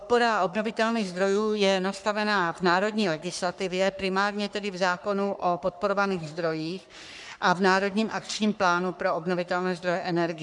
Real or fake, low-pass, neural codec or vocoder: fake; 10.8 kHz; codec, 44.1 kHz, 3.4 kbps, Pupu-Codec